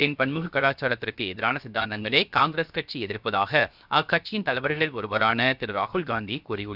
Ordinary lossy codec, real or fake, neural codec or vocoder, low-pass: none; fake; codec, 16 kHz, 0.7 kbps, FocalCodec; 5.4 kHz